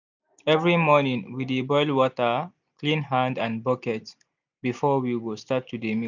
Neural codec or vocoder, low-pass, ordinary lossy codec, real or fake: none; 7.2 kHz; none; real